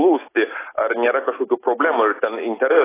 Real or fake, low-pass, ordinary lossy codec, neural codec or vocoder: real; 3.6 kHz; AAC, 16 kbps; none